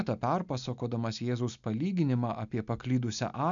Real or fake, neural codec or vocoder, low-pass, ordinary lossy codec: real; none; 7.2 kHz; MP3, 96 kbps